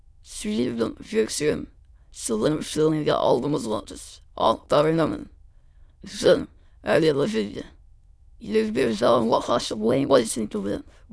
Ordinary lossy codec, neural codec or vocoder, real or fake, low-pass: none; autoencoder, 22.05 kHz, a latent of 192 numbers a frame, VITS, trained on many speakers; fake; none